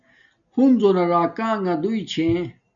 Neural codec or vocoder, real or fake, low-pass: none; real; 7.2 kHz